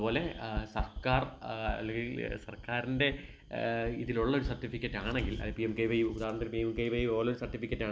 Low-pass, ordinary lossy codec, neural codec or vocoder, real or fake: none; none; none; real